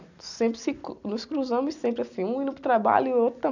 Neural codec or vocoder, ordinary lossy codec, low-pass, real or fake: none; none; 7.2 kHz; real